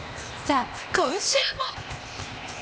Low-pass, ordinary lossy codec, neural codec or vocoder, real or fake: none; none; codec, 16 kHz, 0.8 kbps, ZipCodec; fake